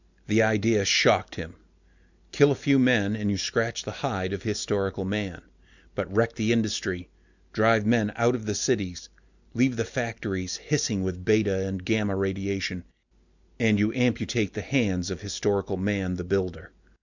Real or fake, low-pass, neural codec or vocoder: real; 7.2 kHz; none